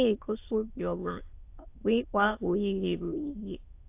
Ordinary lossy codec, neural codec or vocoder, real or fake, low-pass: AAC, 24 kbps; autoencoder, 22.05 kHz, a latent of 192 numbers a frame, VITS, trained on many speakers; fake; 3.6 kHz